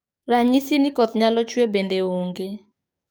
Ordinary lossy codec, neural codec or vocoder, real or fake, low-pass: none; codec, 44.1 kHz, 7.8 kbps, DAC; fake; none